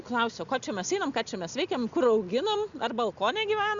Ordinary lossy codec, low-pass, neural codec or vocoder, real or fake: Opus, 64 kbps; 7.2 kHz; none; real